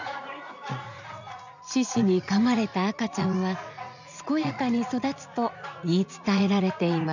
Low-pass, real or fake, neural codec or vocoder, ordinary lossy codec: 7.2 kHz; fake; vocoder, 44.1 kHz, 80 mel bands, Vocos; none